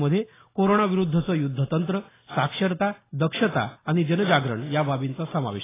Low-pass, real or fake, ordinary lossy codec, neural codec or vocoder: 3.6 kHz; real; AAC, 16 kbps; none